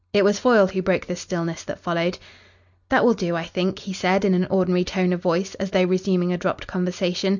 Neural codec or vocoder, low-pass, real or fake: none; 7.2 kHz; real